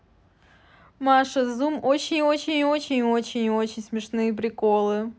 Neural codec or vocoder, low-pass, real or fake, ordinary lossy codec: none; none; real; none